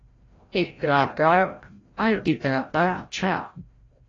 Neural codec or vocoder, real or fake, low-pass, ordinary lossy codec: codec, 16 kHz, 0.5 kbps, FreqCodec, larger model; fake; 7.2 kHz; AAC, 32 kbps